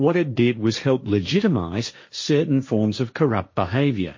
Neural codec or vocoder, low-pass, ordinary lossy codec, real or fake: codec, 16 kHz, 1.1 kbps, Voila-Tokenizer; 7.2 kHz; MP3, 32 kbps; fake